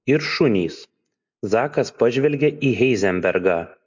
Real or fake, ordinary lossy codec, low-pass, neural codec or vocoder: real; MP3, 64 kbps; 7.2 kHz; none